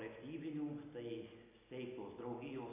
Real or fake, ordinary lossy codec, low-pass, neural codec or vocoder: real; AAC, 24 kbps; 3.6 kHz; none